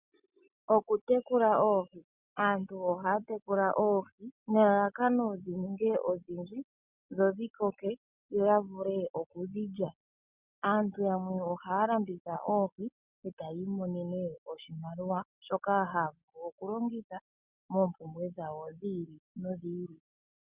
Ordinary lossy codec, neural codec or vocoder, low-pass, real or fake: Opus, 64 kbps; none; 3.6 kHz; real